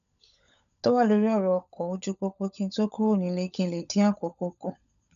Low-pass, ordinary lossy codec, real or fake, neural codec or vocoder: 7.2 kHz; none; fake; codec, 16 kHz, 16 kbps, FunCodec, trained on LibriTTS, 50 frames a second